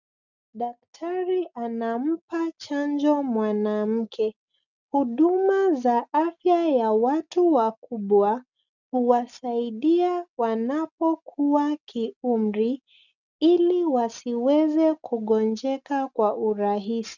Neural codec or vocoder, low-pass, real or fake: none; 7.2 kHz; real